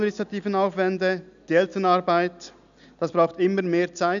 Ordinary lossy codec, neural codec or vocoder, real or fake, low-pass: none; none; real; 7.2 kHz